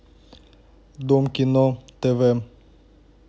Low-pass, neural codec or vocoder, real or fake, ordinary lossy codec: none; none; real; none